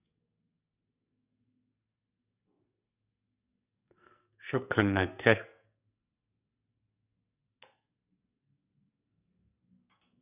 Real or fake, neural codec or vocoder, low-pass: fake; codec, 44.1 kHz, 2.6 kbps, SNAC; 3.6 kHz